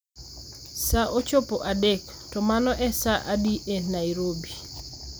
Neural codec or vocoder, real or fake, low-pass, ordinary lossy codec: none; real; none; none